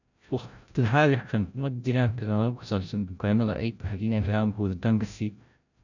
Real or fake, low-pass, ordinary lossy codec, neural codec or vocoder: fake; 7.2 kHz; none; codec, 16 kHz, 0.5 kbps, FreqCodec, larger model